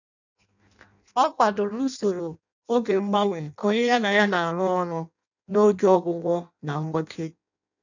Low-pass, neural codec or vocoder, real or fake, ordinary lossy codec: 7.2 kHz; codec, 16 kHz in and 24 kHz out, 0.6 kbps, FireRedTTS-2 codec; fake; none